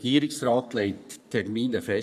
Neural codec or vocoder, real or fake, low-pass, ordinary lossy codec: codec, 44.1 kHz, 3.4 kbps, Pupu-Codec; fake; 14.4 kHz; none